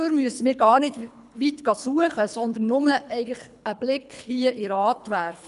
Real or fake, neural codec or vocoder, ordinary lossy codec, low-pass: fake; codec, 24 kHz, 3 kbps, HILCodec; none; 10.8 kHz